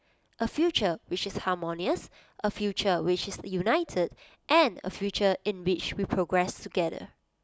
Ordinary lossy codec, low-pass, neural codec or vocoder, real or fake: none; none; none; real